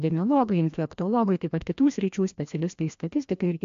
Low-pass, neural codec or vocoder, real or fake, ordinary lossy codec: 7.2 kHz; codec, 16 kHz, 1 kbps, FreqCodec, larger model; fake; AAC, 64 kbps